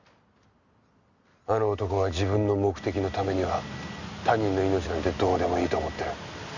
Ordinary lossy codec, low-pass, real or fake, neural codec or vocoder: none; 7.2 kHz; real; none